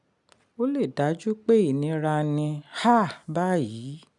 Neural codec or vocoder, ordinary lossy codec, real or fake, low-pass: none; none; real; 10.8 kHz